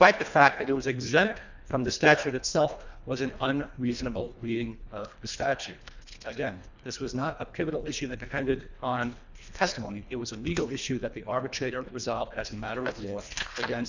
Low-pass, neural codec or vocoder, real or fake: 7.2 kHz; codec, 24 kHz, 1.5 kbps, HILCodec; fake